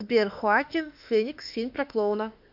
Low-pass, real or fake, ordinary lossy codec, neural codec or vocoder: 5.4 kHz; fake; AAC, 48 kbps; autoencoder, 48 kHz, 32 numbers a frame, DAC-VAE, trained on Japanese speech